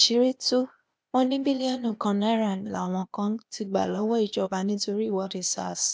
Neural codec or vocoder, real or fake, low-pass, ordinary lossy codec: codec, 16 kHz, 0.8 kbps, ZipCodec; fake; none; none